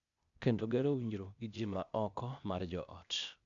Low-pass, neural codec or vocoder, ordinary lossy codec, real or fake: 7.2 kHz; codec, 16 kHz, 0.8 kbps, ZipCodec; MP3, 96 kbps; fake